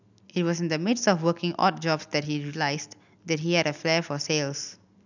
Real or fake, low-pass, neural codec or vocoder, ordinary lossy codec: real; 7.2 kHz; none; none